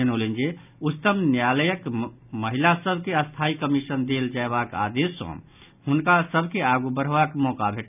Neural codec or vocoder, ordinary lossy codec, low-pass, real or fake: none; none; 3.6 kHz; real